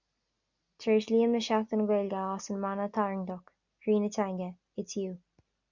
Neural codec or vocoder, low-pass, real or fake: none; 7.2 kHz; real